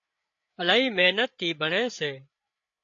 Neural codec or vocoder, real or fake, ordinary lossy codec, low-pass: codec, 16 kHz, 8 kbps, FreqCodec, larger model; fake; AAC, 48 kbps; 7.2 kHz